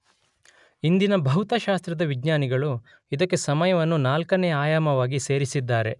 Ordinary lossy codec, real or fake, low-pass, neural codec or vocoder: none; real; 10.8 kHz; none